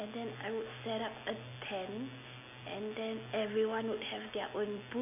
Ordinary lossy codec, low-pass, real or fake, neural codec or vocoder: none; 3.6 kHz; real; none